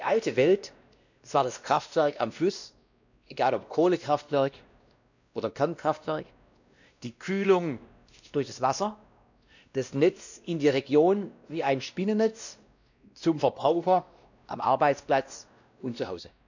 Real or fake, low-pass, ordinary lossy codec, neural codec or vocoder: fake; 7.2 kHz; none; codec, 16 kHz, 1 kbps, X-Codec, WavLM features, trained on Multilingual LibriSpeech